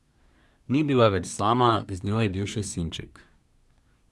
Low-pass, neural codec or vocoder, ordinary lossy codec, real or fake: none; codec, 24 kHz, 1 kbps, SNAC; none; fake